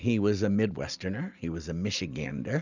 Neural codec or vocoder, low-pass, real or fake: none; 7.2 kHz; real